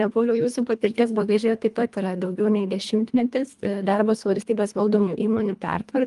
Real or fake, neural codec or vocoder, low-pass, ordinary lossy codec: fake; codec, 24 kHz, 1.5 kbps, HILCodec; 10.8 kHz; Opus, 32 kbps